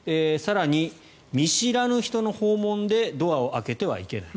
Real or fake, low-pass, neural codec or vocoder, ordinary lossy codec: real; none; none; none